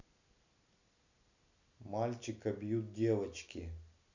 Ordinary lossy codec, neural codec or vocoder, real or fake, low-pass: none; none; real; 7.2 kHz